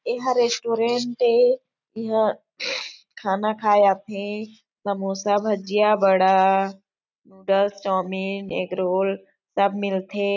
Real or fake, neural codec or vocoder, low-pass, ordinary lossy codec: real; none; 7.2 kHz; none